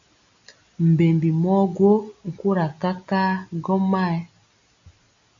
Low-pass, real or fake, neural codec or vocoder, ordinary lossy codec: 7.2 kHz; real; none; AAC, 64 kbps